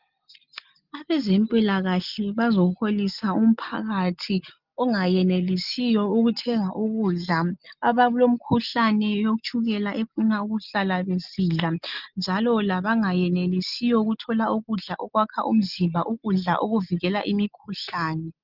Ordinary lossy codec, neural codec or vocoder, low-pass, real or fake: Opus, 32 kbps; none; 5.4 kHz; real